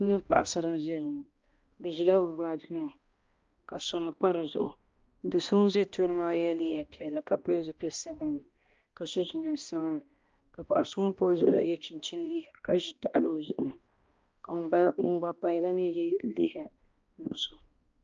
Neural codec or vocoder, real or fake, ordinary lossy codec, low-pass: codec, 16 kHz, 1 kbps, X-Codec, HuBERT features, trained on balanced general audio; fake; Opus, 24 kbps; 7.2 kHz